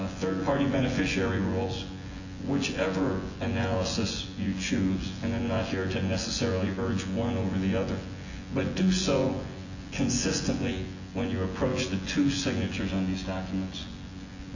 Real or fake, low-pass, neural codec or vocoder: fake; 7.2 kHz; vocoder, 24 kHz, 100 mel bands, Vocos